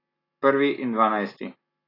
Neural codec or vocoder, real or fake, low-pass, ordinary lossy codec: none; real; 5.4 kHz; AAC, 24 kbps